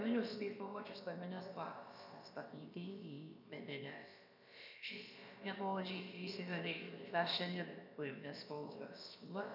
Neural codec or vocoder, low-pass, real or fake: codec, 16 kHz, about 1 kbps, DyCAST, with the encoder's durations; 5.4 kHz; fake